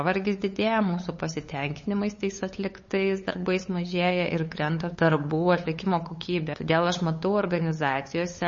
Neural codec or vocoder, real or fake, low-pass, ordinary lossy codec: codec, 16 kHz, 8 kbps, FunCodec, trained on LibriTTS, 25 frames a second; fake; 7.2 kHz; MP3, 32 kbps